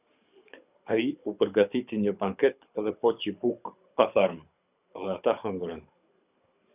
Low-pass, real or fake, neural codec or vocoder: 3.6 kHz; fake; codec, 24 kHz, 6 kbps, HILCodec